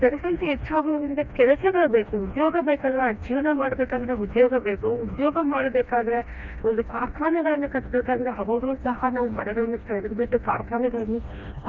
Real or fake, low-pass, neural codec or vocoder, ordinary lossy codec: fake; 7.2 kHz; codec, 16 kHz, 1 kbps, FreqCodec, smaller model; none